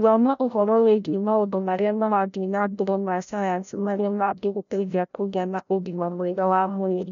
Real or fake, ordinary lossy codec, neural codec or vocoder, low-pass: fake; none; codec, 16 kHz, 0.5 kbps, FreqCodec, larger model; 7.2 kHz